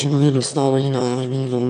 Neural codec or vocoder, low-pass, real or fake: autoencoder, 22.05 kHz, a latent of 192 numbers a frame, VITS, trained on one speaker; 9.9 kHz; fake